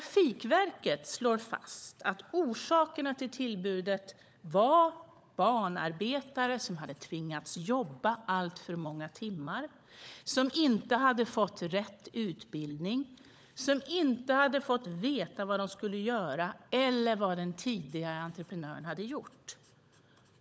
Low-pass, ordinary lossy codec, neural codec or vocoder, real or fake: none; none; codec, 16 kHz, 16 kbps, FunCodec, trained on LibriTTS, 50 frames a second; fake